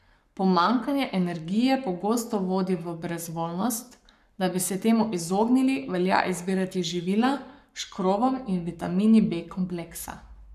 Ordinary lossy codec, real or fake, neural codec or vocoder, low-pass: none; fake; codec, 44.1 kHz, 7.8 kbps, Pupu-Codec; 14.4 kHz